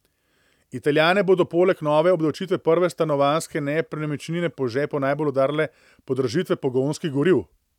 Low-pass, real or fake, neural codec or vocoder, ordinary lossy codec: 19.8 kHz; real; none; none